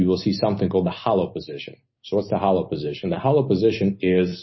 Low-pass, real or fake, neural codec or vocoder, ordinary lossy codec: 7.2 kHz; real; none; MP3, 24 kbps